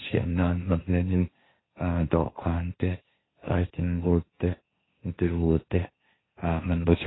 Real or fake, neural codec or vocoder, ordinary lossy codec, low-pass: fake; codec, 16 kHz, 1.1 kbps, Voila-Tokenizer; AAC, 16 kbps; 7.2 kHz